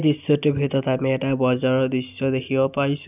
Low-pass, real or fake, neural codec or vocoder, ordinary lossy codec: 3.6 kHz; real; none; none